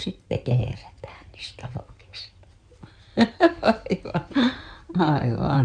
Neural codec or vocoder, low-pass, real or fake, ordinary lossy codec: codec, 16 kHz in and 24 kHz out, 2.2 kbps, FireRedTTS-2 codec; 9.9 kHz; fake; none